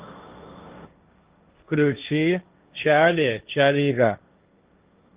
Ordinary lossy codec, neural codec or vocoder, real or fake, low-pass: Opus, 32 kbps; codec, 16 kHz, 1.1 kbps, Voila-Tokenizer; fake; 3.6 kHz